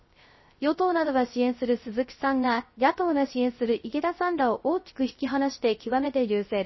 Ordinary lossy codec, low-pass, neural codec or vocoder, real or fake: MP3, 24 kbps; 7.2 kHz; codec, 16 kHz, 0.3 kbps, FocalCodec; fake